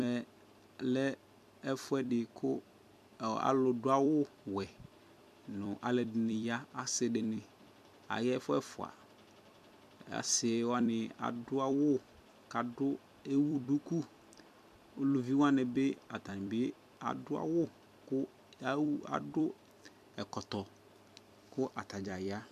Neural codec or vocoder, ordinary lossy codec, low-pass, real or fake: vocoder, 44.1 kHz, 128 mel bands every 256 samples, BigVGAN v2; MP3, 96 kbps; 14.4 kHz; fake